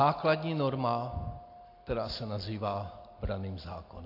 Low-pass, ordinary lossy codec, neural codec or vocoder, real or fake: 5.4 kHz; AAC, 32 kbps; none; real